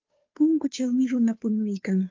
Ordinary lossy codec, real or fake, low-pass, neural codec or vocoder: Opus, 24 kbps; fake; 7.2 kHz; codec, 16 kHz, 2 kbps, FunCodec, trained on Chinese and English, 25 frames a second